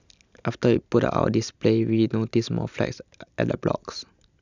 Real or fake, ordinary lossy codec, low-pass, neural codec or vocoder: real; none; 7.2 kHz; none